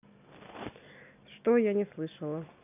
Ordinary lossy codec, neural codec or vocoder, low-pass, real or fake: none; none; 3.6 kHz; real